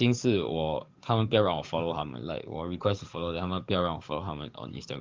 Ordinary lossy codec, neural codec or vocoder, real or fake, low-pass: Opus, 24 kbps; codec, 44.1 kHz, 7.8 kbps, DAC; fake; 7.2 kHz